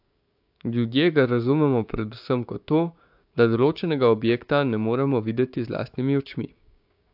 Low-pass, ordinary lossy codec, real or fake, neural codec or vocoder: 5.4 kHz; AAC, 48 kbps; fake; codec, 16 kHz, 6 kbps, DAC